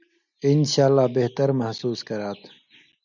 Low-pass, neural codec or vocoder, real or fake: 7.2 kHz; none; real